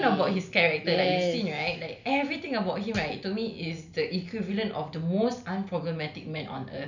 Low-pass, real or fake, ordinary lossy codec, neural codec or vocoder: 7.2 kHz; real; none; none